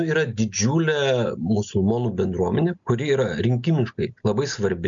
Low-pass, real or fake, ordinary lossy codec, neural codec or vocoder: 7.2 kHz; real; MP3, 64 kbps; none